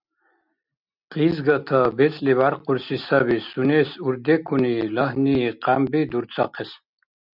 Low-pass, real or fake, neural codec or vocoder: 5.4 kHz; real; none